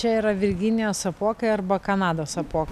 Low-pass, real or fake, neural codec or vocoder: 14.4 kHz; real; none